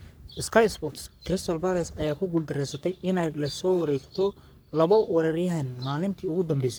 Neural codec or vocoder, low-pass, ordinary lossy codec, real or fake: codec, 44.1 kHz, 3.4 kbps, Pupu-Codec; none; none; fake